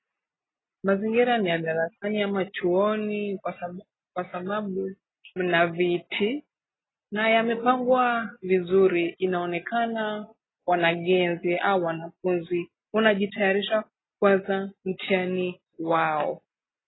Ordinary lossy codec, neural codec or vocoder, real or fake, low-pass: AAC, 16 kbps; none; real; 7.2 kHz